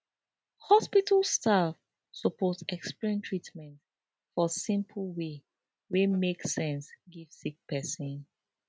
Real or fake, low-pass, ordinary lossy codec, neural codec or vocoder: real; none; none; none